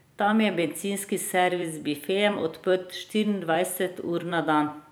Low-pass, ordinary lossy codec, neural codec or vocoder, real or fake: none; none; vocoder, 44.1 kHz, 128 mel bands every 256 samples, BigVGAN v2; fake